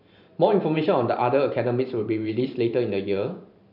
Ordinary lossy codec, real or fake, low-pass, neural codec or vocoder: none; fake; 5.4 kHz; vocoder, 44.1 kHz, 128 mel bands every 512 samples, BigVGAN v2